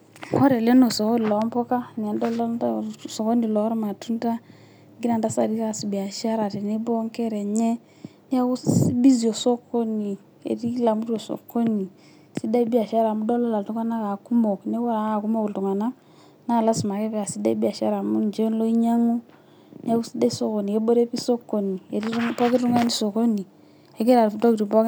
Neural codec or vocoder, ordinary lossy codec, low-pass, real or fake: none; none; none; real